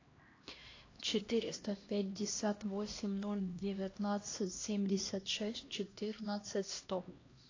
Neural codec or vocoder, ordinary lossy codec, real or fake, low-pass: codec, 16 kHz, 1 kbps, X-Codec, HuBERT features, trained on LibriSpeech; AAC, 32 kbps; fake; 7.2 kHz